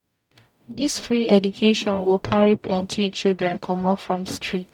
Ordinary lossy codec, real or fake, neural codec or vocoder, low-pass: none; fake; codec, 44.1 kHz, 0.9 kbps, DAC; 19.8 kHz